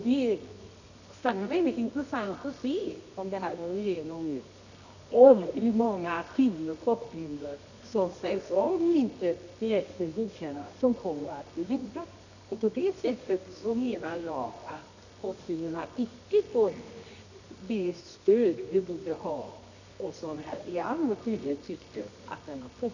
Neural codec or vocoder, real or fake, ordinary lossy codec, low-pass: codec, 24 kHz, 0.9 kbps, WavTokenizer, medium music audio release; fake; none; 7.2 kHz